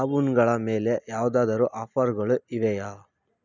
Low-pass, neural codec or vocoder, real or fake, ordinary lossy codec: 7.2 kHz; none; real; none